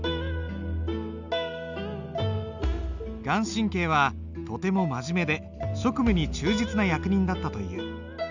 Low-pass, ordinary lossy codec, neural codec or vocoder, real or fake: 7.2 kHz; none; none; real